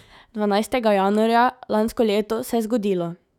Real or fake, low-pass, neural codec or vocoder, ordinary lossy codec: fake; 19.8 kHz; autoencoder, 48 kHz, 128 numbers a frame, DAC-VAE, trained on Japanese speech; none